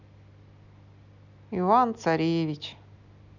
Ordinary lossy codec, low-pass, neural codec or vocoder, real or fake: none; 7.2 kHz; none; real